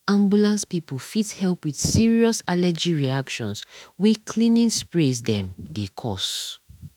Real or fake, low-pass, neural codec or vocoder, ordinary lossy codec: fake; 19.8 kHz; autoencoder, 48 kHz, 32 numbers a frame, DAC-VAE, trained on Japanese speech; none